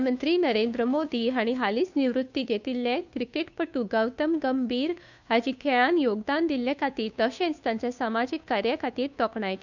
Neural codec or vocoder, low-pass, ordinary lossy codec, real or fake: codec, 16 kHz, 2 kbps, FunCodec, trained on Chinese and English, 25 frames a second; 7.2 kHz; none; fake